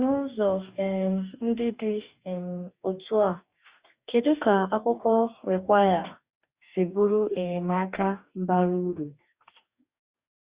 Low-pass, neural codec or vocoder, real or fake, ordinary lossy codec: 3.6 kHz; codec, 44.1 kHz, 2.6 kbps, DAC; fake; Opus, 64 kbps